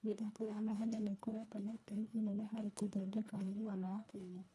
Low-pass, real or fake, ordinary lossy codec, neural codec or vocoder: none; fake; none; codec, 24 kHz, 1.5 kbps, HILCodec